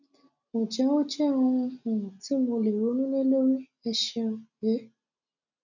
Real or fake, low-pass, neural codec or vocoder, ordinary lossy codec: real; 7.2 kHz; none; none